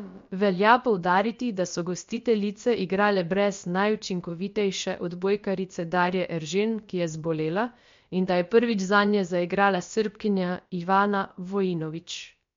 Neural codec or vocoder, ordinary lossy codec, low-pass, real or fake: codec, 16 kHz, about 1 kbps, DyCAST, with the encoder's durations; MP3, 48 kbps; 7.2 kHz; fake